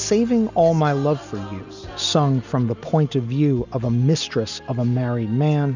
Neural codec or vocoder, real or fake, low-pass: none; real; 7.2 kHz